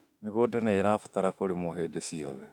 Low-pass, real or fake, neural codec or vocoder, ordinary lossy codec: 19.8 kHz; fake; autoencoder, 48 kHz, 32 numbers a frame, DAC-VAE, trained on Japanese speech; none